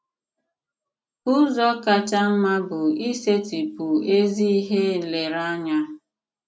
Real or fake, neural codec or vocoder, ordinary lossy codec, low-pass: real; none; none; none